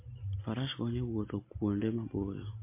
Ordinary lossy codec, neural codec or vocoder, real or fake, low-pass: MP3, 24 kbps; none; real; 3.6 kHz